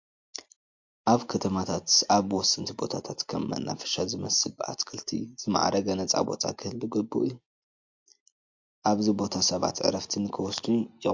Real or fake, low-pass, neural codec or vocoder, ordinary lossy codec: real; 7.2 kHz; none; MP3, 48 kbps